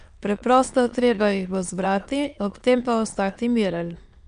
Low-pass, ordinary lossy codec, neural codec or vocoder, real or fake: 9.9 kHz; MP3, 64 kbps; autoencoder, 22.05 kHz, a latent of 192 numbers a frame, VITS, trained on many speakers; fake